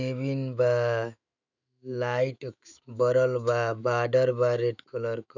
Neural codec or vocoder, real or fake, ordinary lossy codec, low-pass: autoencoder, 48 kHz, 128 numbers a frame, DAC-VAE, trained on Japanese speech; fake; none; 7.2 kHz